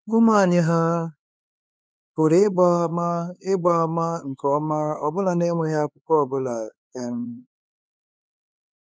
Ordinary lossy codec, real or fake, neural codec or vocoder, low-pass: none; fake; codec, 16 kHz, 4 kbps, X-Codec, HuBERT features, trained on LibriSpeech; none